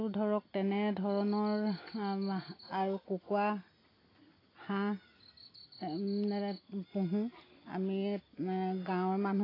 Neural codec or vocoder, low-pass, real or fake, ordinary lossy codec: none; 5.4 kHz; real; AAC, 24 kbps